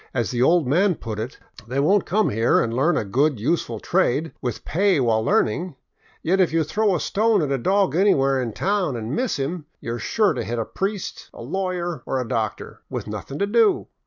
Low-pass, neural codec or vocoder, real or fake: 7.2 kHz; none; real